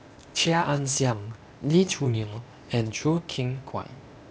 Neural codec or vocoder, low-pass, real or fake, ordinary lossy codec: codec, 16 kHz, 0.8 kbps, ZipCodec; none; fake; none